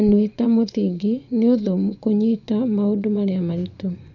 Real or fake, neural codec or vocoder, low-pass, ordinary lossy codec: fake; vocoder, 24 kHz, 100 mel bands, Vocos; 7.2 kHz; none